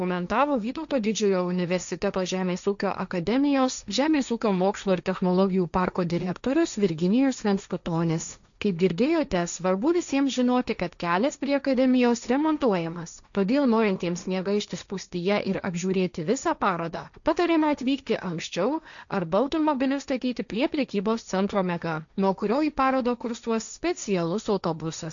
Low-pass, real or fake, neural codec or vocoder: 7.2 kHz; fake; codec, 16 kHz, 1.1 kbps, Voila-Tokenizer